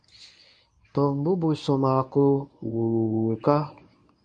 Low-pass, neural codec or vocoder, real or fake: 9.9 kHz; codec, 24 kHz, 0.9 kbps, WavTokenizer, medium speech release version 1; fake